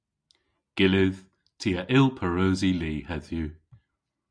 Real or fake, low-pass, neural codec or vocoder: real; 9.9 kHz; none